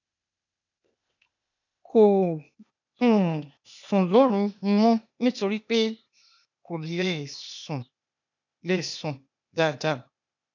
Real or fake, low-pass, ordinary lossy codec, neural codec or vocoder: fake; 7.2 kHz; none; codec, 16 kHz, 0.8 kbps, ZipCodec